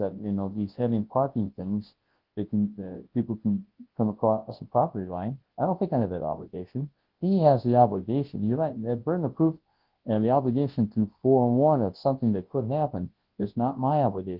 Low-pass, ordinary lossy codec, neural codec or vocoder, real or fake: 5.4 kHz; Opus, 16 kbps; codec, 24 kHz, 0.9 kbps, WavTokenizer, large speech release; fake